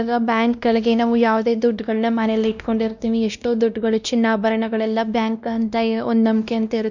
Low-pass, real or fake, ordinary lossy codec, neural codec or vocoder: 7.2 kHz; fake; none; codec, 16 kHz, 1 kbps, X-Codec, WavLM features, trained on Multilingual LibriSpeech